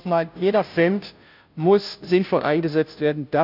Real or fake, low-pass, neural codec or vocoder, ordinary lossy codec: fake; 5.4 kHz; codec, 16 kHz, 0.5 kbps, FunCodec, trained on Chinese and English, 25 frames a second; none